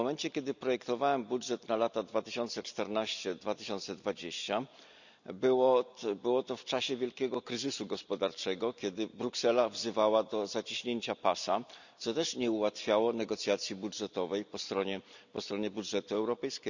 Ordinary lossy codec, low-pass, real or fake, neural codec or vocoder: none; 7.2 kHz; real; none